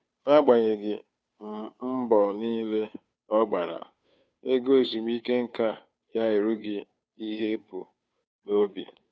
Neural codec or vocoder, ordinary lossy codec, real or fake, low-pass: codec, 16 kHz, 2 kbps, FunCodec, trained on Chinese and English, 25 frames a second; none; fake; none